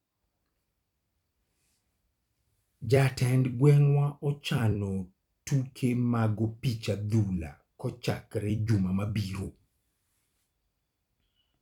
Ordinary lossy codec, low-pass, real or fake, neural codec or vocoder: none; 19.8 kHz; fake; vocoder, 44.1 kHz, 128 mel bands every 256 samples, BigVGAN v2